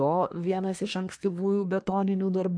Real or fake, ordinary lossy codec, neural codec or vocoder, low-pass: fake; MP3, 64 kbps; codec, 24 kHz, 1 kbps, SNAC; 9.9 kHz